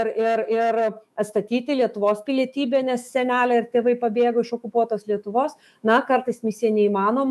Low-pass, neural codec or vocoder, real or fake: 14.4 kHz; autoencoder, 48 kHz, 128 numbers a frame, DAC-VAE, trained on Japanese speech; fake